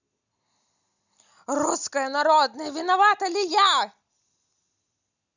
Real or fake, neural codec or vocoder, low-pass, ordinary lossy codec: real; none; 7.2 kHz; none